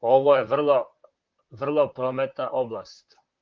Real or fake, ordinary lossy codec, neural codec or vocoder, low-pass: fake; Opus, 32 kbps; vocoder, 44.1 kHz, 128 mel bands, Pupu-Vocoder; 7.2 kHz